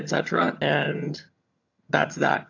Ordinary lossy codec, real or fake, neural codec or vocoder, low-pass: AAC, 48 kbps; fake; vocoder, 22.05 kHz, 80 mel bands, HiFi-GAN; 7.2 kHz